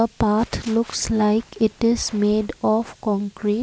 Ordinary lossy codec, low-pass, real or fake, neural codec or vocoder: none; none; real; none